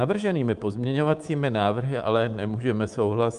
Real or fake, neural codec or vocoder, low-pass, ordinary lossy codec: fake; codec, 24 kHz, 3.1 kbps, DualCodec; 10.8 kHz; Opus, 32 kbps